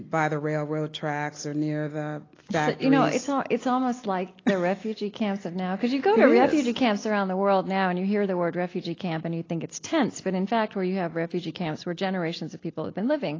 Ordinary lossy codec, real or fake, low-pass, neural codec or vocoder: AAC, 32 kbps; real; 7.2 kHz; none